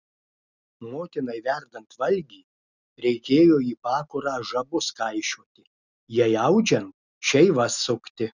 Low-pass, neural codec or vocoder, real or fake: 7.2 kHz; none; real